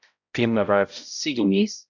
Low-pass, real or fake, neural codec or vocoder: 7.2 kHz; fake; codec, 16 kHz, 0.5 kbps, X-Codec, HuBERT features, trained on balanced general audio